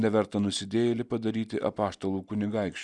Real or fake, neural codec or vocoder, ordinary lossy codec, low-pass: real; none; Opus, 64 kbps; 10.8 kHz